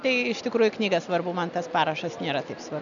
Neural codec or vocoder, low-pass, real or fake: none; 7.2 kHz; real